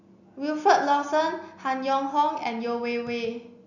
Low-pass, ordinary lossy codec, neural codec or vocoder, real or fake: 7.2 kHz; none; none; real